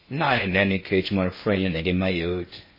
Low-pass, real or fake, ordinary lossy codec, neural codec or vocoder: 5.4 kHz; fake; MP3, 24 kbps; codec, 16 kHz in and 24 kHz out, 0.6 kbps, FocalCodec, streaming, 2048 codes